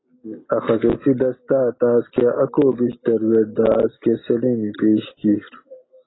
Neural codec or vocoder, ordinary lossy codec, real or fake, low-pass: none; AAC, 16 kbps; real; 7.2 kHz